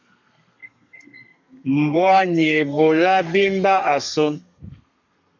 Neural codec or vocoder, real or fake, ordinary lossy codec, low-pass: codec, 32 kHz, 1.9 kbps, SNAC; fake; MP3, 64 kbps; 7.2 kHz